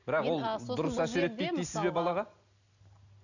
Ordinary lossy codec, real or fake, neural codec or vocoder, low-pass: none; real; none; 7.2 kHz